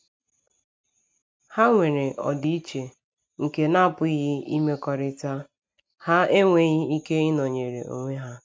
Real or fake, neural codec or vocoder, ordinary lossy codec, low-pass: real; none; none; none